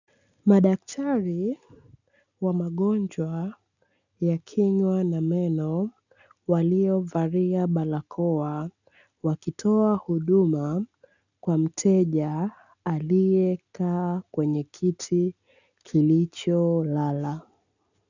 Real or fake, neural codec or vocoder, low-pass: real; none; 7.2 kHz